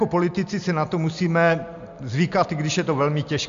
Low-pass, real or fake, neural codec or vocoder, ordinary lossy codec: 7.2 kHz; real; none; MP3, 64 kbps